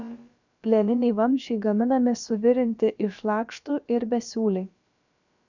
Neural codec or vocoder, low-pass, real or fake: codec, 16 kHz, about 1 kbps, DyCAST, with the encoder's durations; 7.2 kHz; fake